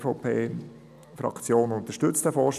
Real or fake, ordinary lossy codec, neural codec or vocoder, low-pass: real; none; none; 14.4 kHz